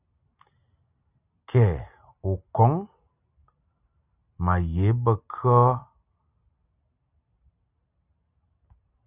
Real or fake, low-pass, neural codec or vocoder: real; 3.6 kHz; none